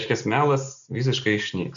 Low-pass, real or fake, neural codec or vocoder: 7.2 kHz; real; none